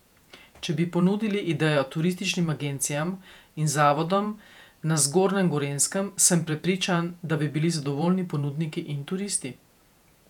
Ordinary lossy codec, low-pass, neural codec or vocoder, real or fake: none; 19.8 kHz; vocoder, 48 kHz, 128 mel bands, Vocos; fake